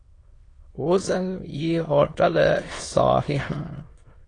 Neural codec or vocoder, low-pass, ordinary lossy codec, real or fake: autoencoder, 22.05 kHz, a latent of 192 numbers a frame, VITS, trained on many speakers; 9.9 kHz; AAC, 32 kbps; fake